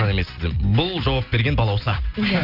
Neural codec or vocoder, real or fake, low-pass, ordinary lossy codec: none; real; 5.4 kHz; Opus, 24 kbps